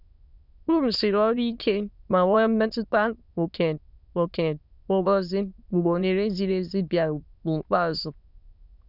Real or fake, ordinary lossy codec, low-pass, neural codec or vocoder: fake; none; 5.4 kHz; autoencoder, 22.05 kHz, a latent of 192 numbers a frame, VITS, trained on many speakers